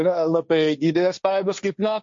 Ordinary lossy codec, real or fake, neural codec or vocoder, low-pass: MP3, 48 kbps; fake; codec, 16 kHz, 1.1 kbps, Voila-Tokenizer; 7.2 kHz